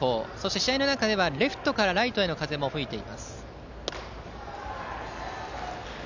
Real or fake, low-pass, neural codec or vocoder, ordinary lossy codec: real; 7.2 kHz; none; none